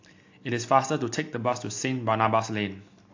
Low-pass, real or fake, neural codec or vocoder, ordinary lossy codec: 7.2 kHz; real; none; AAC, 48 kbps